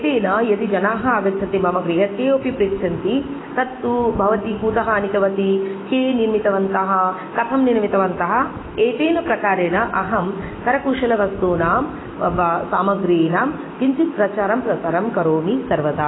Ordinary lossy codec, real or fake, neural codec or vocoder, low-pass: AAC, 16 kbps; real; none; 7.2 kHz